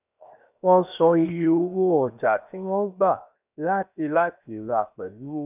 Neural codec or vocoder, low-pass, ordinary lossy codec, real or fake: codec, 16 kHz, 0.7 kbps, FocalCodec; 3.6 kHz; none; fake